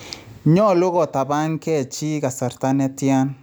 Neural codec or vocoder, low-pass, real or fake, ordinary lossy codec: none; none; real; none